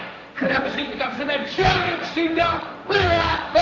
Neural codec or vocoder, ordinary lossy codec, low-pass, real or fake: codec, 16 kHz, 1.1 kbps, Voila-Tokenizer; none; none; fake